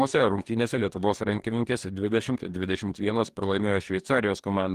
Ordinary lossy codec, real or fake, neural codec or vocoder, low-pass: Opus, 24 kbps; fake; codec, 44.1 kHz, 2.6 kbps, DAC; 14.4 kHz